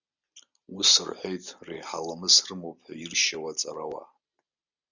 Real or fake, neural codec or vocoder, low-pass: real; none; 7.2 kHz